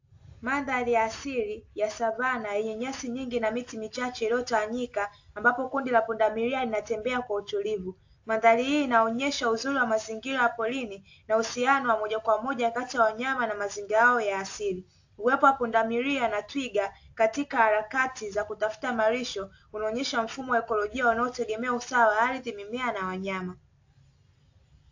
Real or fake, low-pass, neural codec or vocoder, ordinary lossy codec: real; 7.2 kHz; none; AAC, 48 kbps